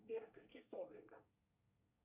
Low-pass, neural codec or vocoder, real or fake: 3.6 kHz; codec, 24 kHz, 1 kbps, SNAC; fake